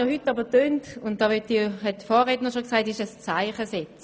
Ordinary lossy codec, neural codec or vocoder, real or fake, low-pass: none; none; real; none